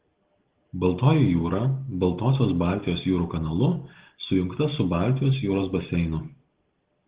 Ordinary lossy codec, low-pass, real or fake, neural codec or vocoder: Opus, 16 kbps; 3.6 kHz; real; none